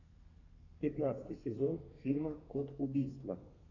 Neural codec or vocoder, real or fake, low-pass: codec, 32 kHz, 1.9 kbps, SNAC; fake; 7.2 kHz